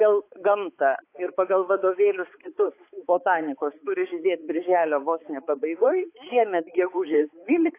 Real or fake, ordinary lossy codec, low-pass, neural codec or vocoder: fake; AAC, 24 kbps; 3.6 kHz; codec, 16 kHz, 4 kbps, X-Codec, HuBERT features, trained on balanced general audio